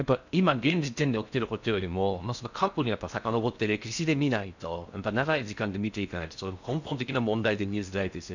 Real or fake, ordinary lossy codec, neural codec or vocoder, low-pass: fake; none; codec, 16 kHz in and 24 kHz out, 0.6 kbps, FocalCodec, streaming, 4096 codes; 7.2 kHz